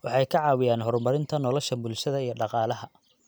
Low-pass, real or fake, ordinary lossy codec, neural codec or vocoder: none; real; none; none